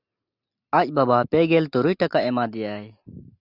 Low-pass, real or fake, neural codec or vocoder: 5.4 kHz; real; none